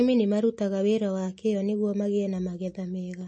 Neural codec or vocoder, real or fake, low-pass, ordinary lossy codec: none; real; 10.8 kHz; MP3, 32 kbps